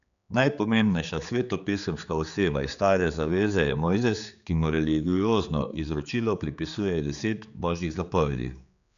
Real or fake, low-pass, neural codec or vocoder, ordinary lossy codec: fake; 7.2 kHz; codec, 16 kHz, 4 kbps, X-Codec, HuBERT features, trained on general audio; none